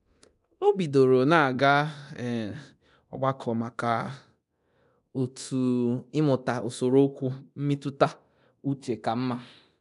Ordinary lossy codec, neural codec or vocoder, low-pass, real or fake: none; codec, 24 kHz, 0.9 kbps, DualCodec; 10.8 kHz; fake